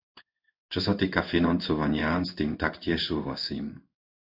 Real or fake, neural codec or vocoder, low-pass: fake; codec, 16 kHz in and 24 kHz out, 1 kbps, XY-Tokenizer; 5.4 kHz